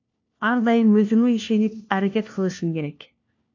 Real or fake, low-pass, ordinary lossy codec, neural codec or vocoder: fake; 7.2 kHz; AAC, 48 kbps; codec, 16 kHz, 1 kbps, FunCodec, trained on LibriTTS, 50 frames a second